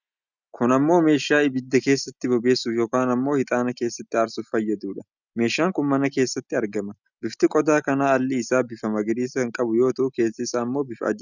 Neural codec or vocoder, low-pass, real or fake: none; 7.2 kHz; real